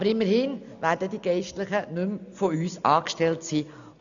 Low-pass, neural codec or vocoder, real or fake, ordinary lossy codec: 7.2 kHz; none; real; none